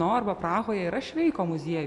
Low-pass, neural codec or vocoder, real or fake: 10.8 kHz; none; real